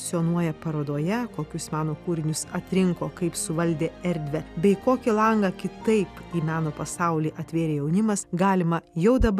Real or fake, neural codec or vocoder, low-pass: real; none; 14.4 kHz